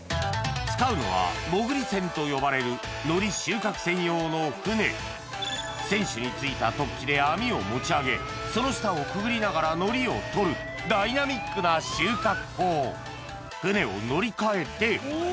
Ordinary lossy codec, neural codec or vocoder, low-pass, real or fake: none; none; none; real